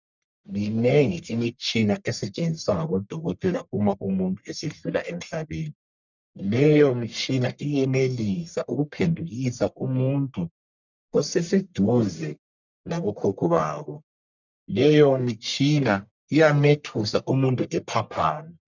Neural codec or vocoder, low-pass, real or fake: codec, 44.1 kHz, 1.7 kbps, Pupu-Codec; 7.2 kHz; fake